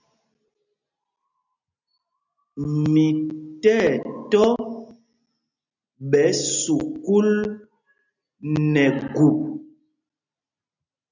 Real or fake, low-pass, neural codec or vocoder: real; 7.2 kHz; none